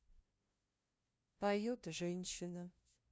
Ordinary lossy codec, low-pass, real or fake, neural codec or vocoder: none; none; fake; codec, 16 kHz, 0.5 kbps, FunCodec, trained on LibriTTS, 25 frames a second